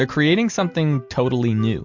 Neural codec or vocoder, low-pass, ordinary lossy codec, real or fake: none; 7.2 kHz; MP3, 64 kbps; real